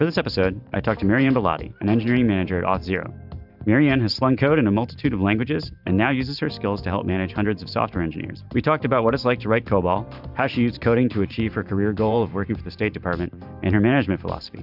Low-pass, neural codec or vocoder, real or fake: 5.4 kHz; vocoder, 44.1 kHz, 128 mel bands every 512 samples, BigVGAN v2; fake